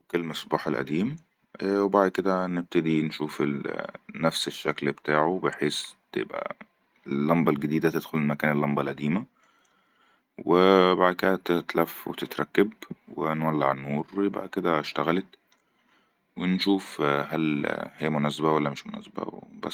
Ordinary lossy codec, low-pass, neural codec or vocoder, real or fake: Opus, 24 kbps; 19.8 kHz; none; real